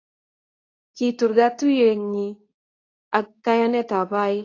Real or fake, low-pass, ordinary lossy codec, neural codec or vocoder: fake; 7.2 kHz; AAC, 32 kbps; codec, 24 kHz, 0.9 kbps, WavTokenizer, medium speech release version 2